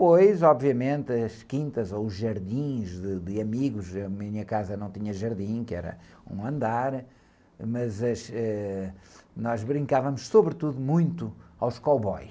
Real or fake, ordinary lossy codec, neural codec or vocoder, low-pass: real; none; none; none